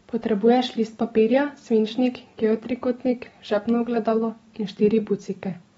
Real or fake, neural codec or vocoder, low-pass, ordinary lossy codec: real; none; 19.8 kHz; AAC, 24 kbps